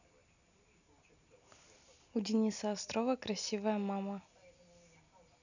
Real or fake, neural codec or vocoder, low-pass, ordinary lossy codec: real; none; 7.2 kHz; none